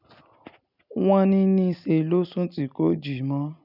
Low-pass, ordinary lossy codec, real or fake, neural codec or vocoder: 5.4 kHz; none; real; none